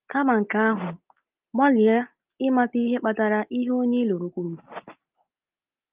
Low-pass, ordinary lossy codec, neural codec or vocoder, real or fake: 3.6 kHz; Opus, 32 kbps; none; real